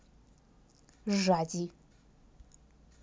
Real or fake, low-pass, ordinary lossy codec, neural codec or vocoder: real; none; none; none